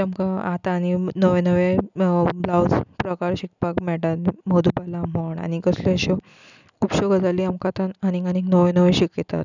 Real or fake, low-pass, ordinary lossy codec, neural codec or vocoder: real; 7.2 kHz; none; none